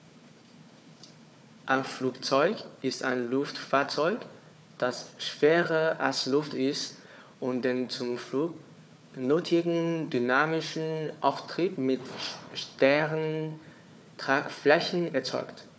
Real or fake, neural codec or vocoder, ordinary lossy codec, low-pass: fake; codec, 16 kHz, 4 kbps, FunCodec, trained on Chinese and English, 50 frames a second; none; none